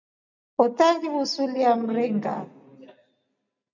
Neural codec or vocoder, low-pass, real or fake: none; 7.2 kHz; real